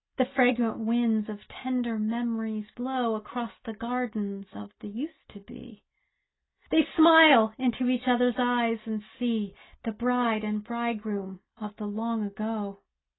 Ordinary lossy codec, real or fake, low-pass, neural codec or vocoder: AAC, 16 kbps; real; 7.2 kHz; none